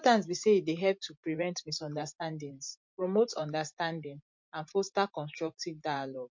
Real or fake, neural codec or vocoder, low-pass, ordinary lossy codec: fake; vocoder, 44.1 kHz, 128 mel bands every 512 samples, BigVGAN v2; 7.2 kHz; MP3, 48 kbps